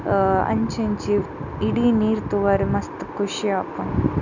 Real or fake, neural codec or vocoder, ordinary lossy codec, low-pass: real; none; none; 7.2 kHz